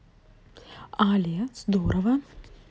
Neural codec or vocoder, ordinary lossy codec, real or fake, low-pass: none; none; real; none